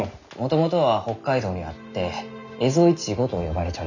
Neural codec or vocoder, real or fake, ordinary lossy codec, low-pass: none; real; none; 7.2 kHz